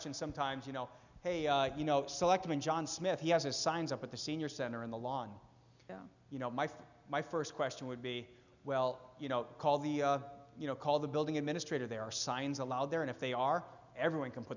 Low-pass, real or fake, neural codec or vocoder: 7.2 kHz; real; none